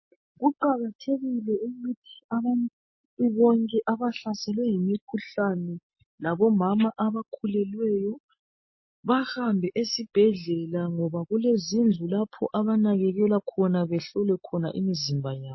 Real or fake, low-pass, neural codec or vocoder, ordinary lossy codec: real; 7.2 kHz; none; MP3, 24 kbps